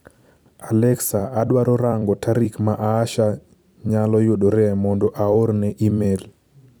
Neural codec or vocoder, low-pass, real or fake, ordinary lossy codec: vocoder, 44.1 kHz, 128 mel bands every 256 samples, BigVGAN v2; none; fake; none